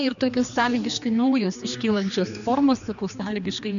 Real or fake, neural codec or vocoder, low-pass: fake; codec, 16 kHz, 2 kbps, X-Codec, HuBERT features, trained on general audio; 7.2 kHz